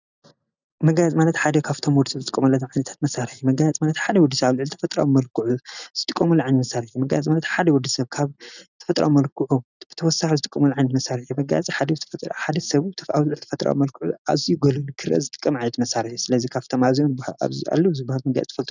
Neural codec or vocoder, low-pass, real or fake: none; 7.2 kHz; real